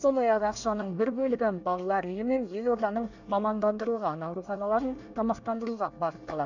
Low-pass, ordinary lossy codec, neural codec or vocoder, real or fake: 7.2 kHz; none; codec, 24 kHz, 1 kbps, SNAC; fake